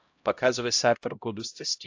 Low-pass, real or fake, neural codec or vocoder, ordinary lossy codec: 7.2 kHz; fake; codec, 16 kHz, 0.5 kbps, X-Codec, HuBERT features, trained on LibriSpeech; AAC, 48 kbps